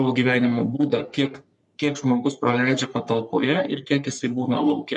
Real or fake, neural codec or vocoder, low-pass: fake; codec, 44.1 kHz, 3.4 kbps, Pupu-Codec; 10.8 kHz